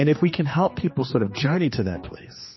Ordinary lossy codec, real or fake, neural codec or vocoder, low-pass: MP3, 24 kbps; fake; codec, 16 kHz, 2 kbps, X-Codec, HuBERT features, trained on general audio; 7.2 kHz